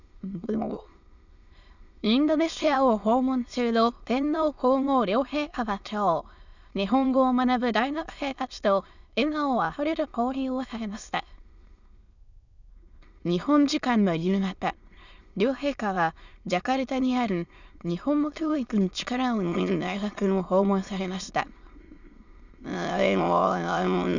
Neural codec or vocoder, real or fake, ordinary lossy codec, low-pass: autoencoder, 22.05 kHz, a latent of 192 numbers a frame, VITS, trained on many speakers; fake; none; 7.2 kHz